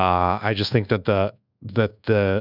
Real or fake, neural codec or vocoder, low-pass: fake; codec, 24 kHz, 1.2 kbps, DualCodec; 5.4 kHz